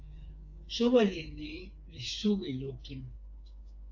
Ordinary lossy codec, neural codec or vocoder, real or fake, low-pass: AAC, 48 kbps; codec, 16 kHz, 4 kbps, FreqCodec, smaller model; fake; 7.2 kHz